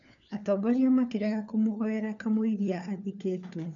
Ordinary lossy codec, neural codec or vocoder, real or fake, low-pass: none; codec, 16 kHz, 4 kbps, FunCodec, trained on LibriTTS, 50 frames a second; fake; 7.2 kHz